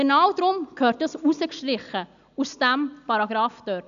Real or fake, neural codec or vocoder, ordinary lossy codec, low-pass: real; none; AAC, 96 kbps; 7.2 kHz